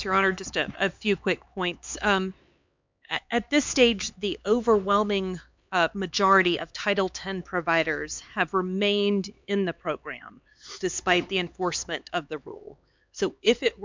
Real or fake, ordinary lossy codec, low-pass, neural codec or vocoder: fake; MP3, 64 kbps; 7.2 kHz; codec, 16 kHz, 4 kbps, X-Codec, HuBERT features, trained on LibriSpeech